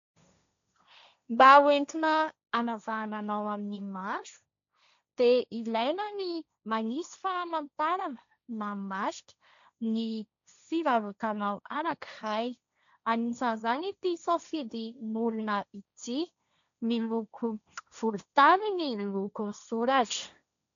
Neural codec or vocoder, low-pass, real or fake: codec, 16 kHz, 1.1 kbps, Voila-Tokenizer; 7.2 kHz; fake